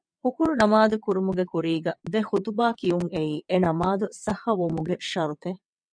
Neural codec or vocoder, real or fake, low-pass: vocoder, 22.05 kHz, 80 mel bands, WaveNeXt; fake; 9.9 kHz